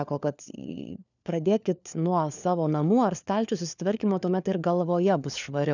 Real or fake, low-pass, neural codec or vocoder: fake; 7.2 kHz; codec, 16 kHz, 4 kbps, FunCodec, trained on LibriTTS, 50 frames a second